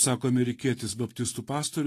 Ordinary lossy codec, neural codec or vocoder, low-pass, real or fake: AAC, 48 kbps; vocoder, 44.1 kHz, 128 mel bands every 512 samples, BigVGAN v2; 14.4 kHz; fake